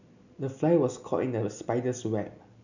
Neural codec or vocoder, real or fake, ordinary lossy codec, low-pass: none; real; none; 7.2 kHz